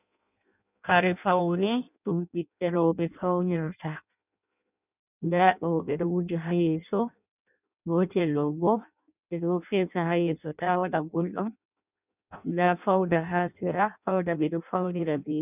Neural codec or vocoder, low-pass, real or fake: codec, 16 kHz in and 24 kHz out, 0.6 kbps, FireRedTTS-2 codec; 3.6 kHz; fake